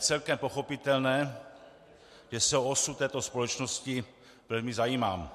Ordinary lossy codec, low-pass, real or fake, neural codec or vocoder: MP3, 64 kbps; 14.4 kHz; real; none